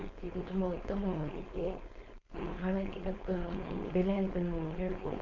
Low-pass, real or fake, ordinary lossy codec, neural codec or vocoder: 7.2 kHz; fake; none; codec, 16 kHz, 4.8 kbps, FACodec